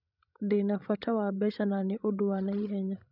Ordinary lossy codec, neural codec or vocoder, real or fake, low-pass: none; codec, 16 kHz, 16 kbps, FreqCodec, larger model; fake; 5.4 kHz